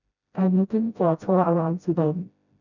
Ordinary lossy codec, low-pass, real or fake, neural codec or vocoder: none; 7.2 kHz; fake; codec, 16 kHz, 0.5 kbps, FreqCodec, smaller model